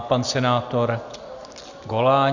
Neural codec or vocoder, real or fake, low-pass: none; real; 7.2 kHz